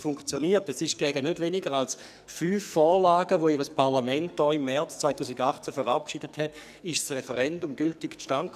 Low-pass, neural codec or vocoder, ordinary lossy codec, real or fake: 14.4 kHz; codec, 44.1 kHz, 2.6 kbps, SNAC; none; fake